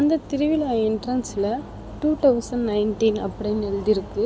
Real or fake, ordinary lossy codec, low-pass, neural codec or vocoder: real; none; none; none